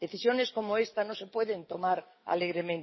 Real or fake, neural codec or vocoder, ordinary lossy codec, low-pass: fake; codec, 44.1 kHz, 7.8 kbps, DAC; MP3, 24 kbps; 7.2 kHz